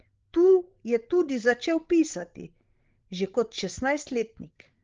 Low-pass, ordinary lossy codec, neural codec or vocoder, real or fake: 7.2 kHz; Opus, 16 kbps; codec, 16 kHz, 16 kbps, FreqCodec, larger model; fake